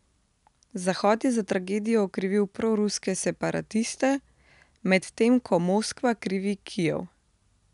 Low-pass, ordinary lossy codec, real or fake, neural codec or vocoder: 10.8 kHz; none; real; none